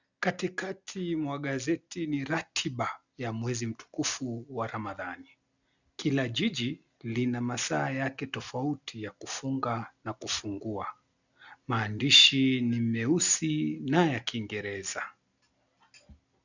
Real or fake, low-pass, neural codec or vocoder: real; 7.2 kHz; none